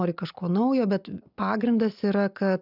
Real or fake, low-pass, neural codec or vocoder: real; 5.4 kHz; none